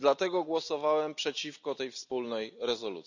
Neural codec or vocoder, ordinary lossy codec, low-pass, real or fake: none; none; 7.2 kHz; real